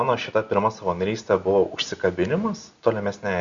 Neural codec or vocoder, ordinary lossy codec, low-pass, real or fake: none; Opus, 64 kbps; 7.2 kHz; real